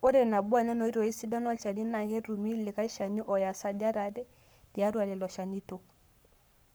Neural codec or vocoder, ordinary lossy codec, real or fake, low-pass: codec, 44.1 kHz, 7.8 kbps, Pupu-Codec; none; fake; none